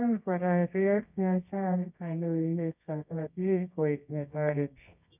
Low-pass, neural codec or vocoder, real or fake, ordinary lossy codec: 3.6 kHz; codec, 24 kHz, 0.9 kbps, WavTokenizer, medium music audio release; fake; none